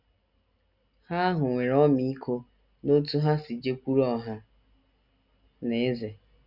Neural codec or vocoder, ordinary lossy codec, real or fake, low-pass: none; none; real; 5.4 kHz